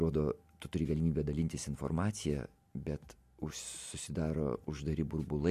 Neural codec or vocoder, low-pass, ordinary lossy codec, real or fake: none; 14.4 kHz; MP3, 64 kbps; real